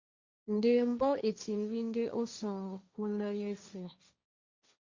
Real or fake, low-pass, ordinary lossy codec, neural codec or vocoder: fake; 7.2 kHz; Opus, 64 kbps; codec, 16 kHz, 1.1 kbps, Voila-Tokenizer